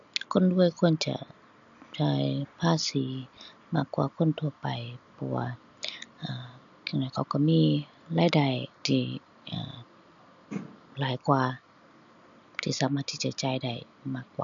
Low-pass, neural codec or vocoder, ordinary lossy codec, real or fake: 7.2 kHz; none; none; real